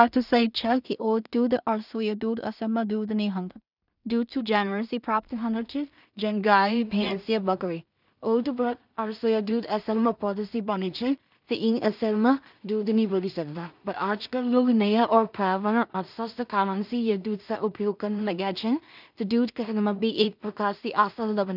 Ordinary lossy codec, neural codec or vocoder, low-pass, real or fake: none; codec, 16 kHz in and 24 kHz out, 0.4 kbps, LongCat-Audio-Codec, two codebook decoder; 5.4 kHz; fake